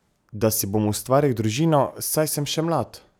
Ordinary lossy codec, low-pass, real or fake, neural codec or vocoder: none; none; real; none